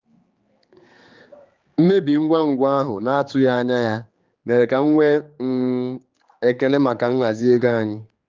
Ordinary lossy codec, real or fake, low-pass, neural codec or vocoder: Opus, 32 kbps; fake; 7.2 kHz; codec, 16 kHz, 4 kbps, X-Codec, HuBERT features, trained on general audio